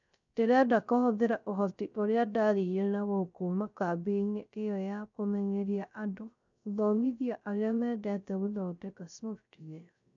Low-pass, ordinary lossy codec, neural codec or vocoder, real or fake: 7.2 kHz; none; codec, 16 kHz, 0.3 kbps, FocalCodec; fake